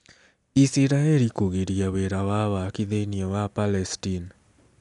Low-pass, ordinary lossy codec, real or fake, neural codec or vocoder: 10.8 kHz; none; real; none